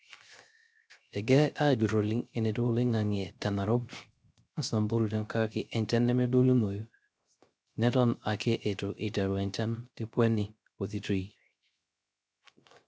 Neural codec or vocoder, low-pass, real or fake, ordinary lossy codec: codec, 16 kHz, 0.3 kbps, FocalCodec; none; fake; none